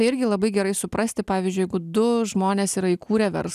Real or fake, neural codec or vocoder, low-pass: real; none; 14.4 kHz